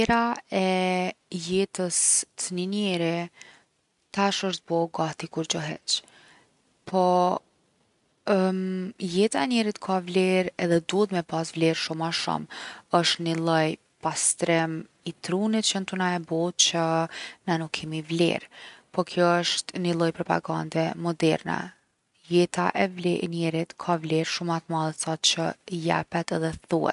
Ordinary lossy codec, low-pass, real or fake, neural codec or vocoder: none; 10.8 kHz; real; none